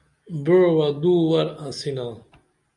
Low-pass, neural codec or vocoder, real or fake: 10.8 kHz; none; real